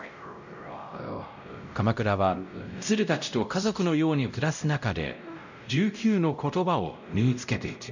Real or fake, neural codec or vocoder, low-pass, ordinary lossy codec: fake; codec, 16 kHz, 0.5 kbps, X-Codec, WavLM features, trained on Multilingual LibriSpeech; 7.2 kHz; none